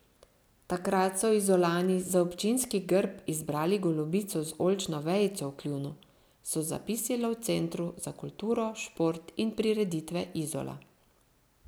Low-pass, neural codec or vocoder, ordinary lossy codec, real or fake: none; none; none; real